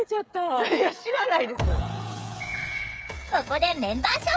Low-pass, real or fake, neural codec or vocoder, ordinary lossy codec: none; fake; codec, 16 kHz, 8 kbps, FreqCodec, smaller model; none